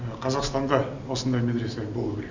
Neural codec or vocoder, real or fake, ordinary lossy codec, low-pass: none; real; none; 7.2 kHz